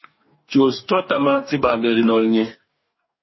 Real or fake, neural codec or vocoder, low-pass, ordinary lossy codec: fake; codec, 44.1 kHz, 2.6 kbps, DAC; 7.2 kHz; MP3, 24 kbps